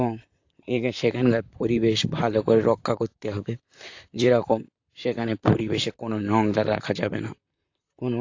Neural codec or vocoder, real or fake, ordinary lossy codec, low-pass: vocoder, 44.1 kHz, 128 mel bands, Pupu-Vocoder; fake; AAC, 48 kbps; 7.2 kHz